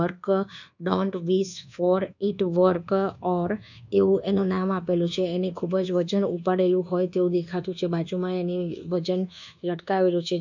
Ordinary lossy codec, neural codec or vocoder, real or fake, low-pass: none; codec, 24 kHz, 1.2 kbps, DualCodec; fake; 7.2 kHz